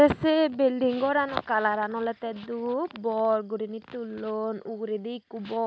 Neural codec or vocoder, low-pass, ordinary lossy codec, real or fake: none; none; none; real